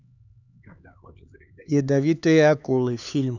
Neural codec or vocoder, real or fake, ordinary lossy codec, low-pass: codec, 16 kHz, 4 kbps, X-Codec, HuBERT features, trained on LibriSpeech; fake; none; 7.2 kHz